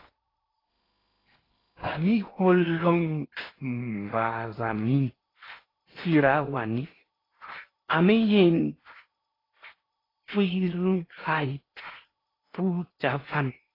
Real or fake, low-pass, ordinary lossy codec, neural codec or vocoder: fake; 5.4 kHz; AAC, 24 kbps; codec, 16 kHz in and 24 kHz out, 0.8 kbps, FocalCodec, streaming, 65536 codes